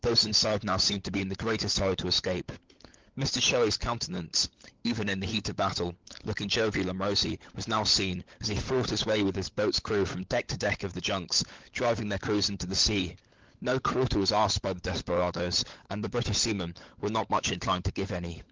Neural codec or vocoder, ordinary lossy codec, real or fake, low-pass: codec, 16 kHz, 16 kbps, FunCodec, trained on LibriTTS, 50 frames a second; Opus, 16 kbps; fake; 7.2 kHz